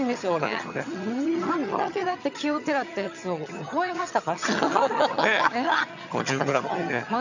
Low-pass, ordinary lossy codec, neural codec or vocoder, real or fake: 7.2 kHz; none; vocoder, 22.05 kHz, 80 mel bands, HiFi-GAN; fake